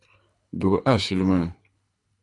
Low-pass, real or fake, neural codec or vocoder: 10.8 kHz; fake; codec, 24 kHz, 3 kbps, HILCodec